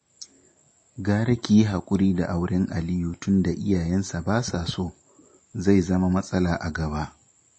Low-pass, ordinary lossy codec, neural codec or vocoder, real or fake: 9.9 kHz; MP3, 32 kbps; none; real